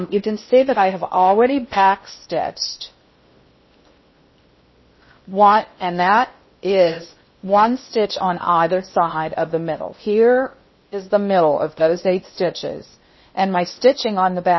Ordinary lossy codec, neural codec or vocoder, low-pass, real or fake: MP3, 24 kbps; codec, 16 kHz in and 24 kHz out, 0.6 kbps, FocalCodec, streaming, 2048 codes; 7.2 kHz; fake